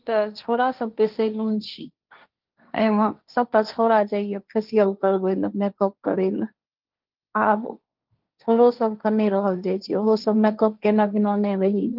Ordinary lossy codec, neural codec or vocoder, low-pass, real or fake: Opus, 24 kbps; codec, 16 kHz, 1.1 kbps, Voila-Tokenizer; 5.4 kHz; fake